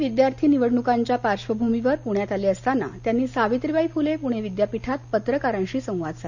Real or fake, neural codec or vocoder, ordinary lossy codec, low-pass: real; none; none; 7.2 kHz